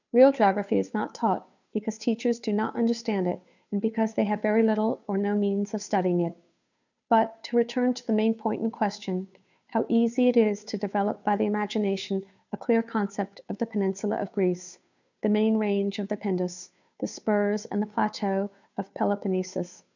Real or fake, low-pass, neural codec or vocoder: fake; 7.2 kHz; codec, 16 kHz, 2 kbps, FunCodec, trained on Chinese and English, 25 frames a second